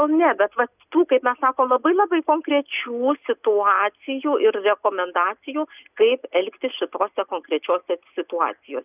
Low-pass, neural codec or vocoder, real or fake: 3.6 kHz; none; real